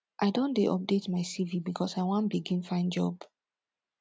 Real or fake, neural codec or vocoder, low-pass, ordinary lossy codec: real; none; none; none